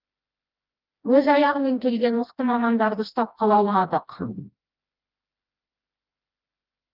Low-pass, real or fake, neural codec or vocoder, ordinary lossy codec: 5.4 kHz; fake; codec, 16 kHz, 1 kbps, FreqCodec, smaller model; Opus, 24 kbps